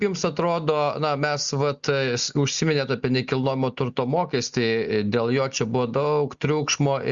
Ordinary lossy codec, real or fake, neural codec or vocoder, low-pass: Opus, 64 kbps; real; none; 7.2 kHz